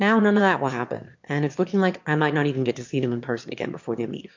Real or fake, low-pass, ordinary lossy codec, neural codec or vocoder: fake; 7.2 kHz; MP3, 48 kbps; autoencoder, 22.05 kHz, a latent of 192 numbers a frame, VITS, trained on one speaker